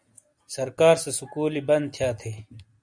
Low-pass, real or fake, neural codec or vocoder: 9.9 kHz; real; none